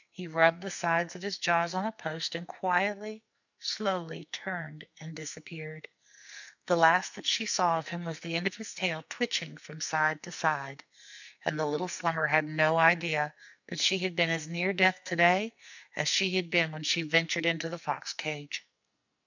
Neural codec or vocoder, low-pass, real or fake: codec, 44.1 kHz, 2.6 kbps, SNAC; 7.2 kHz; fake